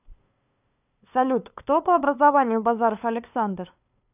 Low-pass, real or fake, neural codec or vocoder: 3.6 kHz; fake; codec, 16 kHz, 2 kbps, FunCodec, trained on LibriTTS, 25 frames a second